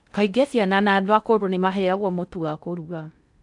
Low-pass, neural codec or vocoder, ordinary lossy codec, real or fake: 10.8 kHz; codec, 16 kHz in and 24 kHz out, 0.6 kbps, FocalCodec, streaming, 4096 codes; none; fake